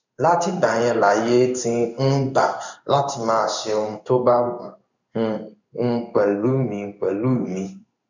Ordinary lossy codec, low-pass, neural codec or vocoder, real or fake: none; 7.2 kHz; codec, 16 kHz in and 24 kHz out, 1 kbps, XY-Tokenizer; fake